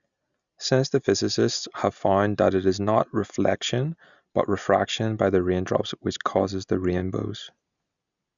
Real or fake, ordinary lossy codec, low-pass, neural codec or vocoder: real; none; 7.2 kHz; none